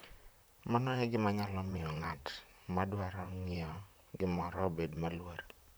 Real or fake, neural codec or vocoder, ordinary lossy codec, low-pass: fake; vocoder, 44.1 kHz, 128 mel bands, Pupu-Vocoder; none; none